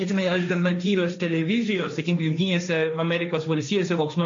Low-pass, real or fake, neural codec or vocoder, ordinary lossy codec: 7.2 kHz; fake; codec, 16 kHz, 1.1 kbps, Voila-Tokenizer; MP3, 48 kbps